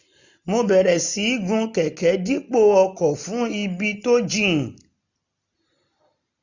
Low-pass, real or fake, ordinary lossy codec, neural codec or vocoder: 7.2 kHz; real; none; none